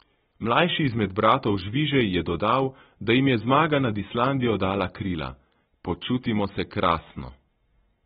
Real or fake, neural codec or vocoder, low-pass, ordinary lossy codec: real; none; 19.8 kHz; AAC, 16 kbps